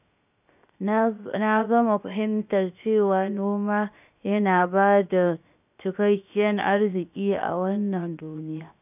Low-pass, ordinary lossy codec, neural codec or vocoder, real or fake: 3.6 kHz; none; codec, 16 kHz, 0.7 kbps, FocalCodec; fake